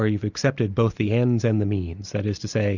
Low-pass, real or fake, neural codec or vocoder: 7.2 kHz; real; none